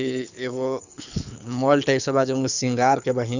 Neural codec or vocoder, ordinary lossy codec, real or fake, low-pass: codec, 24 kHz, 6 kbps, HILCodec; none; fake; 7.2 kHz